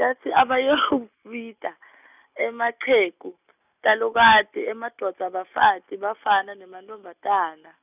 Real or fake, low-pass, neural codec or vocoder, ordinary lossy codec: real; 3.6 kHz; none; none